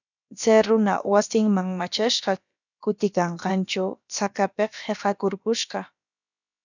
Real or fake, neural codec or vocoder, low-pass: fake; codec, 16 kHz, about 1 kbps, DyCAST, with the encoder's durations; 7.2 kHz